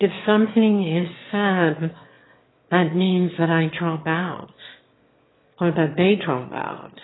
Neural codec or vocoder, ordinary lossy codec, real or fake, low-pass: autoencoder, 22.05 kHz, a latent of 192 numbers a frame, VITS, trained on one speaker; AAC, 16 kbps; fake; 7.2 kHz